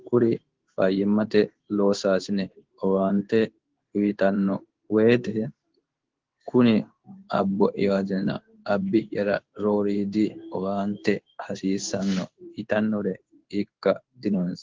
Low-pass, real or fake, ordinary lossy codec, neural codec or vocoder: 7.2 kHz; fake; Opus, 16 kbps; codec, 16 kHz in and 24 kHz out, 1 kbps, XY-Tokenizer